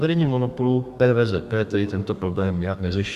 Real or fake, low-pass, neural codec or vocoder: fake; 14.4 kHz; codec, 32 kHz, 1.9 kbps, SNAC